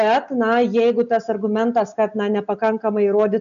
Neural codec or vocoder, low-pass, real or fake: none; 7.2 kHz; real